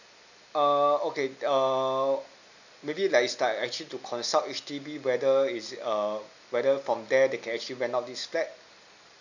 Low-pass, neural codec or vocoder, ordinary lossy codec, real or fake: 7.2 kHz; none; none; real